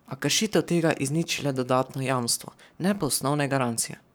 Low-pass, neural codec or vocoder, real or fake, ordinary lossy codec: none; codec, 44.1 kHz, 7.8 kbps, Pupu-Codec; fake; none